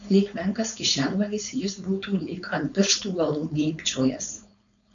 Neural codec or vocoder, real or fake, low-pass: codec, 16 kHz, 4.8 kbps, FACodec; fake; 7.2 kHz